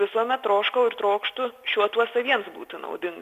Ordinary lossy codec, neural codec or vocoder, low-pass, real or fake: Opus, 64 kbps; none; 14.4 kHz; real